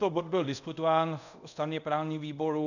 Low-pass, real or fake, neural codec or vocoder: 7.2 kHz; fake; codec, 24 kHz, 0.5 kbps, DualCodec